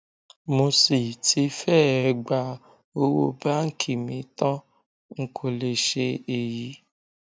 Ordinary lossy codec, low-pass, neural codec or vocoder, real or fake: none; none; none; real